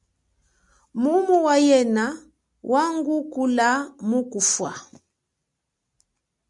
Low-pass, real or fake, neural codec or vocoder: 10.8 kHz; real; none